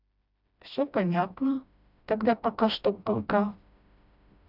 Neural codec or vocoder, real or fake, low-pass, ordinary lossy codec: codec, 16 kHz, 1 kbps, FreqCodec, smaller model; fake; 5.4 kHz; none